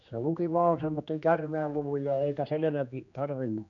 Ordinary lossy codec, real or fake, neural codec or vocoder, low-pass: none; fake; codec, 16 kHz, 2 kbps, X-Codec, HuBERT features, trained on general audio; 7.2 kHz